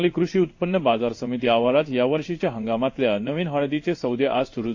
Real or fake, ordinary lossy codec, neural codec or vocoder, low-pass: fake; AAC, 48 kbps; codec, 16 kHz in and 24 kHz out, 1 kbps, XY-Tokenizer; 7.2 kHz